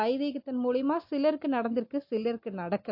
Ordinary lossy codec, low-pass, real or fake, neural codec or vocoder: MP3, 32 kbps; 5.4 kHz; real; none